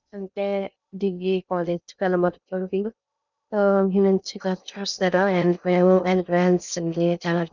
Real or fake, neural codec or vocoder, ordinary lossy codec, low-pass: fake; codec, 16 kHz in and 24 kHz out, 0.6 kbps, FocalCodec, streaming, 2048 codes; none; 7.2 kHz